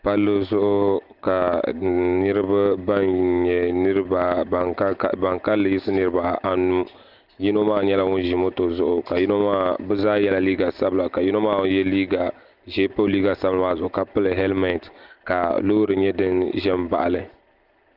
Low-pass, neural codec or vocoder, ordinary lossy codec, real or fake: 5.4 kHz; none; Opus, 32 kbps; real